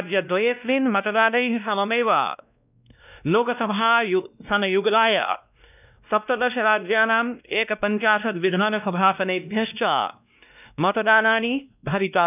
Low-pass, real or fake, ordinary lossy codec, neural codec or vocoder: 3.6 kHz; fake; none; codec, 16 kHz, 1 kbps, X-Codec, WavLM features, trained on Multilingual LibriSpeech